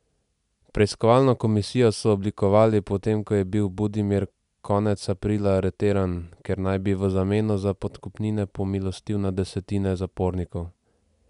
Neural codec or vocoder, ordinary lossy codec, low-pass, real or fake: none; none; 10.8 kHz; real